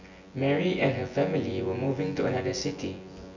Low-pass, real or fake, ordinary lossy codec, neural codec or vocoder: 7.2 kHz; fake; Opus, 64 kbps; vocoder, 24 kHz, 100 mel bands, Vocos